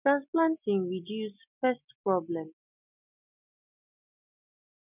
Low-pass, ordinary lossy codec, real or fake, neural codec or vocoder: 3.6 kHz; none; real; none